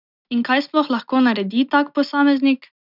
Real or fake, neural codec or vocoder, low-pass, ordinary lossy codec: real; none; 5.4 kHz; none